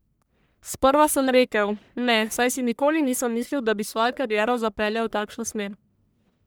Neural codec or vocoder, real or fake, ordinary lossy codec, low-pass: codec, 44.1 kHz, 1.7 kbps, Pupu-Codec; fake; none; none